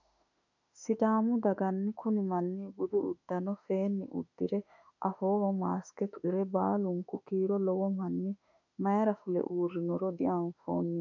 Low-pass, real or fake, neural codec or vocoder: 7.2 kHz; fake; autoencoder, 48 kHz, 32 numbers a frame, DAC-VAE, trained on Japanese speech